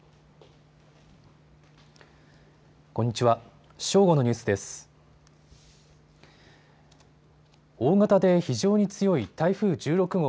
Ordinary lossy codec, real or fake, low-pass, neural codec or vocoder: none; real; none; none